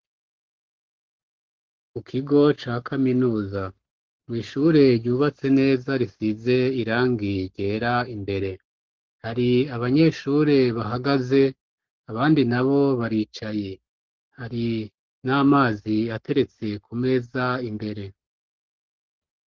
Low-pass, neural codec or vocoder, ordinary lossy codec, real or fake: 7.2 kHz; codec, 44.1 kHz, 7.8 kbps, Pupu-Codec; Opus, 16 kbps; fake